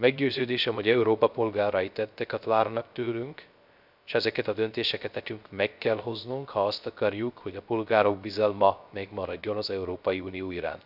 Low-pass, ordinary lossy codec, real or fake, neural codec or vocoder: 5.4 kHz; none; fake; codec, 16 kHz, 0.3 kbps, FocalCodec